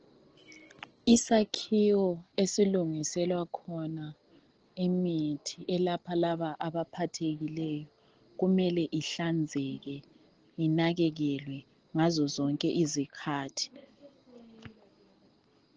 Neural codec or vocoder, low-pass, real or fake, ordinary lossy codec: none; 7.2 kHz; real; Opus, 16 kbps